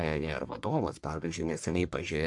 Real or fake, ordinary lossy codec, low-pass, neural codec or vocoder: fake; MP3, 64 kbps; 10.8 kHz; codec, 44.1 kHz, 1.7 kbps, Pupu-Codec